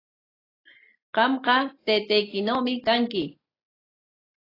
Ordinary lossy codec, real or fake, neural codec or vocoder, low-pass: AAC, 24 kbps; real; none; 5.4 kHz